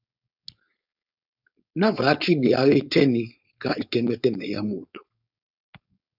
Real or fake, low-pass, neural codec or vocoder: fake; 5.4 kHz; codec, 16 kHz, 4.8 kbps, FACodec